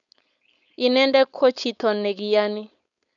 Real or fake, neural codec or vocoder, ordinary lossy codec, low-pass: fake; codec, 16 kHz, 4.8 kbps, FACodec; none; 7.2 kHz